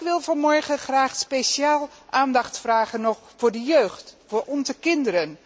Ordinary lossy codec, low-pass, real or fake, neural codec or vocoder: none; none; real; none